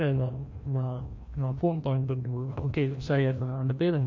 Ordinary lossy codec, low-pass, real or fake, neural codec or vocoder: MP3, 48 kbps; 7.2 kHz; fake; codec, 16 kHz, 1 kbps, FreqCodec, larger model